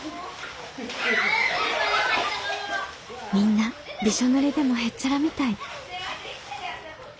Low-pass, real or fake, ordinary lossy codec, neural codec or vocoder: none; real; none; none